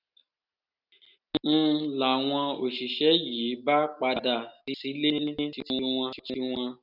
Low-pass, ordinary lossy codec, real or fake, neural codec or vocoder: 5.4 kHz; none; real; none